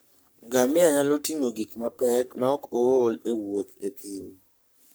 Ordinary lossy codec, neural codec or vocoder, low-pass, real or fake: none; codec, 44.1 kHz, 3.4 kbps, Pupu-Codec; none; fake